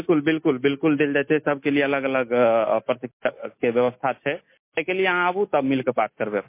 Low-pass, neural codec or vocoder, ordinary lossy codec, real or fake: 3.6 kHz; none; MP3, 24 kbps; real